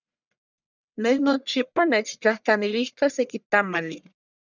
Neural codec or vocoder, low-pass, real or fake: codec, 44.1 kHz, 1.7 kbps, Pupu-Codec; 7.2 kHz; fake